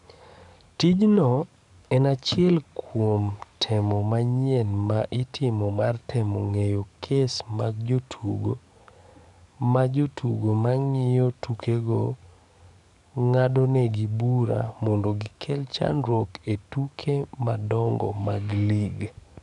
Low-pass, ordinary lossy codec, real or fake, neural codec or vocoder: 10.8 kHz; none; fake; codec, 44.1 kHz, 7.8 kbps, DAC